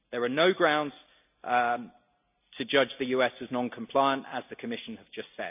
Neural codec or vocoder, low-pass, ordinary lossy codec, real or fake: none; 3.6 kHz; none; real